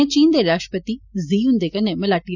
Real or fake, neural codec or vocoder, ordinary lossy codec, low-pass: real; none; none; 7.2 kHz